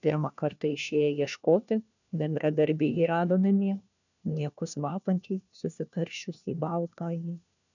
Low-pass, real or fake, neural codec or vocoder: 7.2 kHz; fake; codec, 16 kHz, 1 kbps, FunCodec, trained on LibriTTS, 50 frames a second